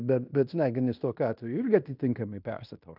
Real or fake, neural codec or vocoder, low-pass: fake; codec, 16 kHz in and 24 kHz out, 0.9 kbps, LongCat-Audio-Codec, fine tuned four codebook decoder; 5.4 kHz